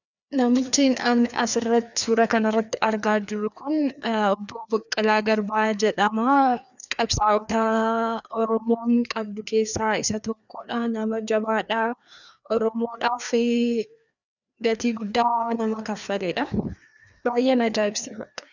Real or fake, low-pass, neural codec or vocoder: fake; 7.2 kHz; codec, 16 kHz, 2 kbps, FreqCodec, larger model